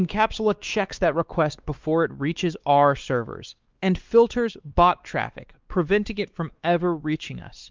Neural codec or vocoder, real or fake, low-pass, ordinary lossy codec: codec, 16 kHz, 2 kbps, X-Codec, WavLM features, trained on Multilingual LibriSpeech; fake; 7.2 kHz; Opus, 32 kbps